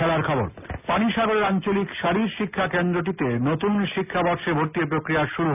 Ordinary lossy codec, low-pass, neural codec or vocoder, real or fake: none; 3.6 kHz; none; real